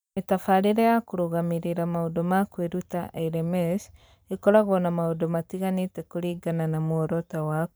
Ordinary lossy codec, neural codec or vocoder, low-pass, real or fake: none; vocoder, 44.1 kHz, 128 mel bands every 512 samples, BigVGAN v2; none; fake